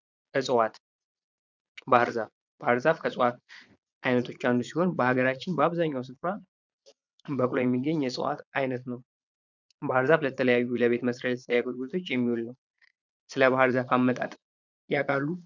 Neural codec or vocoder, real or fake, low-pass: vocoder, 22.05 kHz, 80 mel bands, Vocos; fake; 7.2 kHz